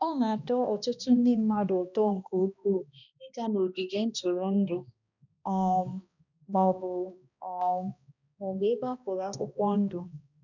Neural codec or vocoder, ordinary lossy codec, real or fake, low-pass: codec, 16 kHz, 1 kbps, X-Codec, HuBERT features, trained on balanced general audio; none; fake; 7.2 kHz